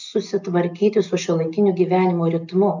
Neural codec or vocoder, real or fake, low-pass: none; real; 7.2 kHz